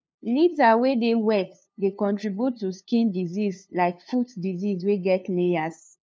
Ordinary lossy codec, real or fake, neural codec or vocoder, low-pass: none; fake; codec, 16 kHz, 2 kbps, FunCodec, trained on LibriTTS, 25 frames a second; none